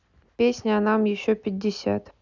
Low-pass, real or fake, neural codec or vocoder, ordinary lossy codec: 7.2 kHz; real; none; none